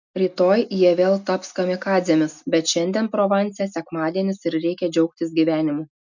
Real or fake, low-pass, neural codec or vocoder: real; 7.2 kHz; none